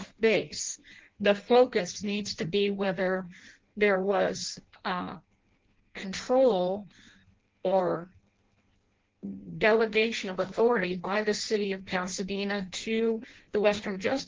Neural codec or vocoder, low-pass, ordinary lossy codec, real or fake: codec, 16 kHz in and 24 kHz out, 0.6 kbps, FireRedTTS-2 codec; 7.2 kHz; Opus, 16 kbps; fake